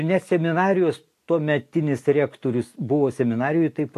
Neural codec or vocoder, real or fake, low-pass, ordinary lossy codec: none; real; 14.4 kHz; AAC, 64 kbps